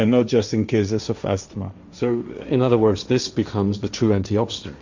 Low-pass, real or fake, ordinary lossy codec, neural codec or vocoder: 7.2 kHz; fake; Opus, 64 kbps; codec, 16 kHz, 1.1 kbps, Voila-Tokenizer